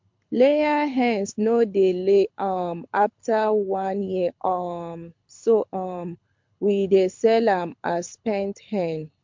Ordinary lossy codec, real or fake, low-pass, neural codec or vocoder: MP3, 48 kbps; fake; 7.2 kHz; codec, 24 kHz, 6 kbps, HILCodec